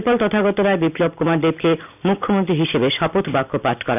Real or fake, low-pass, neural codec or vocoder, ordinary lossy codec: real; 3.6 kHz; none; none